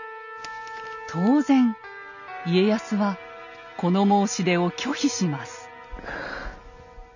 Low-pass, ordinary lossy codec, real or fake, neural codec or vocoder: 7.2 kHz; none; real; none